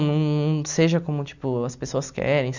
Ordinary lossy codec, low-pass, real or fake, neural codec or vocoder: none; 7.2 kHz; real; none